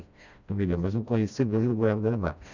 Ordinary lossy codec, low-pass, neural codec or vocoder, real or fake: none; 7.2 kHz; codec, 16 kHz, 1 kbps, FreqCodec, smaller model; fake